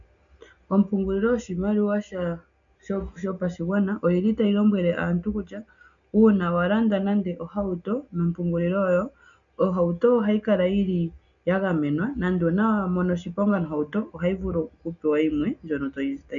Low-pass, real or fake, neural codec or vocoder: 7.2 kHz; real; none